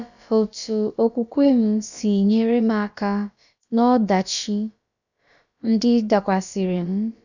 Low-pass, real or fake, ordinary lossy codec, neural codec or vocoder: 7.2 kHz; fake; none; codec, 16 kHz, about 1 kbps, DyCAST, with the encoder's durations